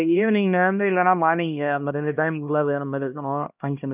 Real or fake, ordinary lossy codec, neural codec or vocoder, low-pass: fake; none; codec, 16 kHz, 1 kbps, X-Codec, HuBERT features, trained on LibriSpeech; 3.6 kHz